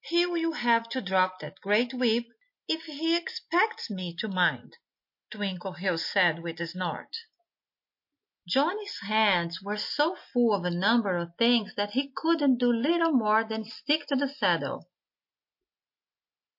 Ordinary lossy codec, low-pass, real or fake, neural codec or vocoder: MP3, 32 kbps; 5.4 kHz; real; none